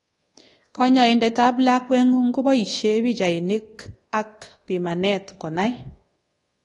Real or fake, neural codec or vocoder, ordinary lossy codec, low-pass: fake; codec, 24 kHz, 1.2 kbps, DualCodec; AAC, 32 kbps; 10.8 kHz